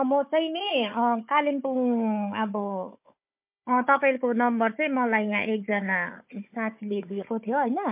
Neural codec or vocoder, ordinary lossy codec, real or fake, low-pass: codec, 16 kHz, 4 kbps, FunCodec, trained on Chinese and English, 50 frames a second; MP3, 32 kbps; fake; 3.6 kHz